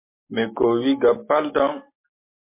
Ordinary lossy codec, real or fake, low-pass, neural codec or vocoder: MP3, 24 kbps; real; 3.6 kHz; none